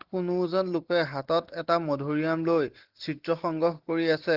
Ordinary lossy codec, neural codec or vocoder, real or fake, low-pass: Opus, 16 kbps; none; real; 5.4 kHz